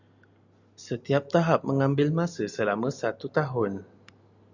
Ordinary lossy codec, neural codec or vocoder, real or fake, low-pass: Opus, 64 kbps; none; real; 7.2 kHz